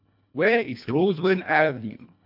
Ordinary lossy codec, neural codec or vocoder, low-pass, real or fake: none; codec, 24 kHz, 1.5 kbps, HILCodec; 5.4 kHz; fake